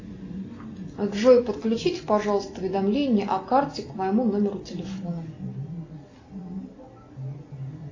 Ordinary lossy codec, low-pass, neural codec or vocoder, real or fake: AAC, 32 kbps; 7.2 kHz; none; real